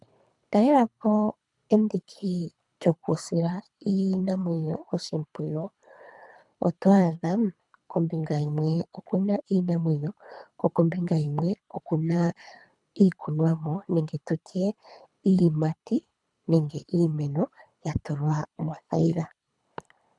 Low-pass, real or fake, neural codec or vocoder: 10.8 kHz; fake; codec, 24 kHz, 3 kbps, HILCodec